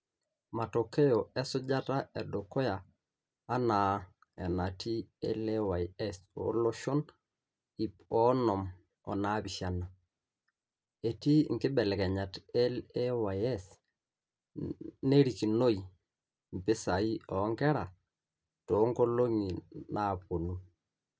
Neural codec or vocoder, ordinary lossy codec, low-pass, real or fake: none; none; none; real